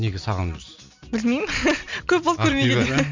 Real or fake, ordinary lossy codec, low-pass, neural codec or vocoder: real; MP3, 64 kbps; 7.2 kHz; none